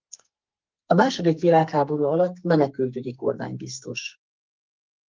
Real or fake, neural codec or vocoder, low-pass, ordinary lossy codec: fake; codec, 44.1 kHz, 2.6 kbps, SNAC; 7.2 kHz; Opus, 24 kbps